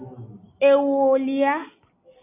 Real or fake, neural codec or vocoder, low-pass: real; none; 3.6 kHz